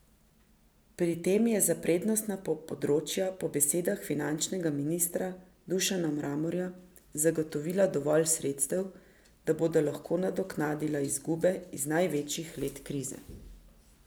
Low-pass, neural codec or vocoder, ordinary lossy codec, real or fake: none; none; none; real